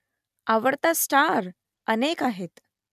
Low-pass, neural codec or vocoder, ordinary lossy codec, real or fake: 14.4 kHz; none; none; real